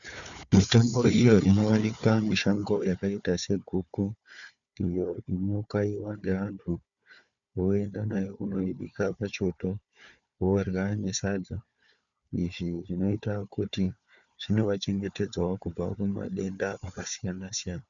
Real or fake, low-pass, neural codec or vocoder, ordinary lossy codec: fake; 7.2 kHz; codec, 16 kHz, 4 kbps, FunCodec, trained on Chinese and English, 50 frames a second; MP3, 96 kbps